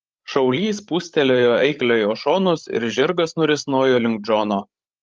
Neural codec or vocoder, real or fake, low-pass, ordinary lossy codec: codec, 16 kHz, 16 kbps, FreqCodec, larger model; fake; 7.2 kHz; Opus, 24 kbps